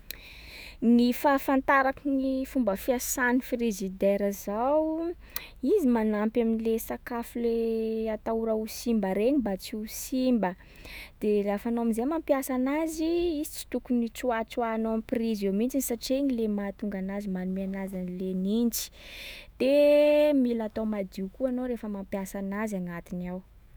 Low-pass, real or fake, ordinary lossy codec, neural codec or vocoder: none; fake; none; autoencoder, 48 kHz, 128 numbers a frame, DAC-VAE, trained on Japanese speech